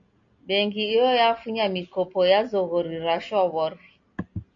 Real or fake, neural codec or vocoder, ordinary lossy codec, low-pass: real; none; AAC, 48 kbps; 7.2 kHz